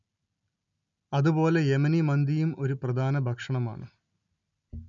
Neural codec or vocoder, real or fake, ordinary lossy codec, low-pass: none; real; none; 7.2 kHz